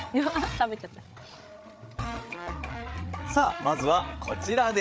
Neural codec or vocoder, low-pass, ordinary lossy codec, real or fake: codec, 16 kHz, 8 kbps, FreqCodec, larger model; none; none; fake